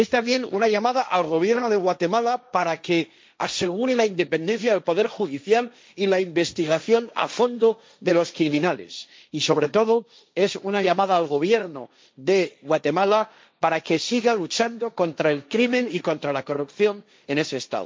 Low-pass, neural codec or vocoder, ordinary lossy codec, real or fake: none; codec, 16 kHz, 1.1 kbps, Voila-Tokenizer; none; fake